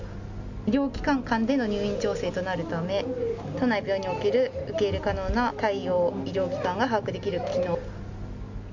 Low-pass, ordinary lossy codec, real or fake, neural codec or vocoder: 7.2 kHz; none; real; none